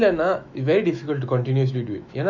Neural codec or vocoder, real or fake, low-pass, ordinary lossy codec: autoencoder, 48 kHz, 128 numbers a frame, DAC-VAE, trained on Japanese speech; fake; 7.2 kHz; none